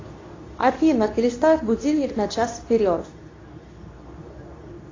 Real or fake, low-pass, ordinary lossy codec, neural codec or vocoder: fake; 7.2 kHz; AAC, 32 kbps; codec, 24 kHz, 0.9 kbps, WavTokenizer, medium speech release version 2